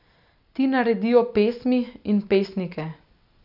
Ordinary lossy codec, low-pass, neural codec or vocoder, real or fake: none; 5.4 kHz; none; real